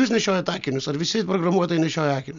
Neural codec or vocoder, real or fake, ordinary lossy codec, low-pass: none; real; MP3, 64 kbps; 7.2 kHz